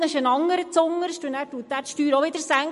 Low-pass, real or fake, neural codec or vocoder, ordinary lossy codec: 14.4 kHz; real; none; MP3, 48 kbps